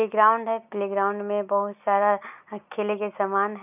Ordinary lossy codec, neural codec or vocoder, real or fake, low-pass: none; none; real; 3.6 kHz